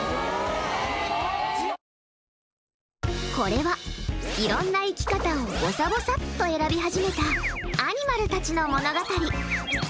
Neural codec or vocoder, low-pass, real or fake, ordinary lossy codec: none; none; real; none